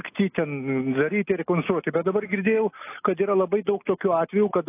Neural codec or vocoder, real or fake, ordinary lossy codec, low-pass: none; real; AAC, 24 kbps; 3.6 kHz